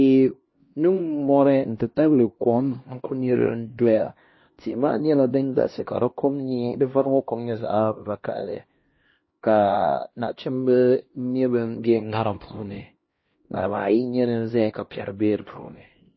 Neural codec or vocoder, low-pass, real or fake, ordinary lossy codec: codec, 16 kHz, 1 kbps, X-Codec, HuBERT features, trained on LibriSpeech; 7.2 kHz; fake; MP3, 24 kbps